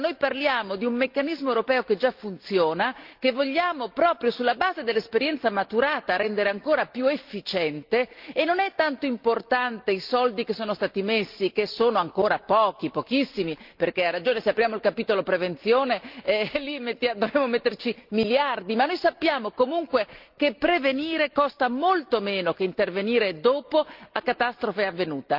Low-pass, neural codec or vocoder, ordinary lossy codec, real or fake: 5.4 kHz; none; Opus, 24 kbps; real